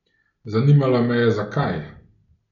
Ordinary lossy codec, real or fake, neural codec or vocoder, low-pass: none; real; none; 7.2 kHz